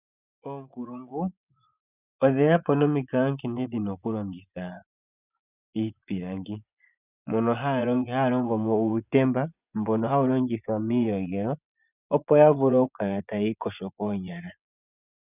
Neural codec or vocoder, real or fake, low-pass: vocoder, 24 kHz, 100 mel bands, Vocos; fake; 3.6 kHz